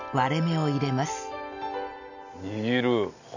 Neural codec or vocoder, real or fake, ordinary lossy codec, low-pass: none; real; none; 7.2 kHz